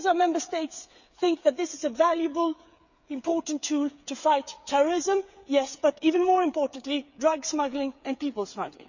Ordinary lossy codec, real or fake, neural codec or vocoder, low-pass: none; fake; codec, 16 kHz, 8 kbps, FreqCodec, smaller model; 7.2 kHz